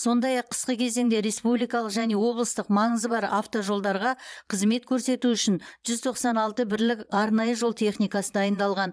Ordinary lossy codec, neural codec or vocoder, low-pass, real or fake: none; vocoder, 22.05 kHz, 80 mel bands, Vocos; none; fake